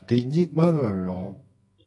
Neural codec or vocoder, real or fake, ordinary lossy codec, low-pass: codec, 24 kHz, 0.9 kbps, WavTokenizer, medium music audio release; fake; MP3, 48 kbps; 10.8 kHz